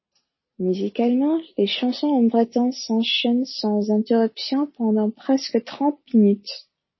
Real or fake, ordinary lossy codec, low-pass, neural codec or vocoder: real; MP3, 24 kbps; 7.2 kHz; none